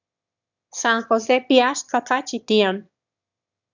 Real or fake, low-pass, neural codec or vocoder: fake; 7.2 kHz; autoencoder, 22.05 kHz, a latent of 192 numbers a frame, VITS, trained on one speaker